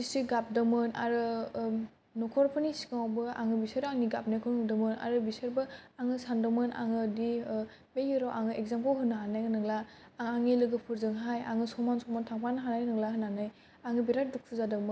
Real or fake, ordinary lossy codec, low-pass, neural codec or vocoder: real; none; none; none